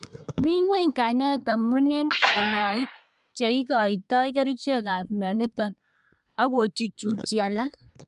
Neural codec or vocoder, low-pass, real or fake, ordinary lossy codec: codec, 24 kHz, 1 kbps, SNAC; 10.8 kHz; fake; MP3, 96 kbps